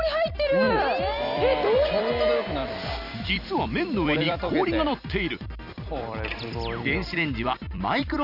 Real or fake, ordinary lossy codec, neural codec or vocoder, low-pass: fake; none; vocoder, 44.1 kHz, 128 mel bands every 256 samples, BigVGAN v2; 5.4 kHz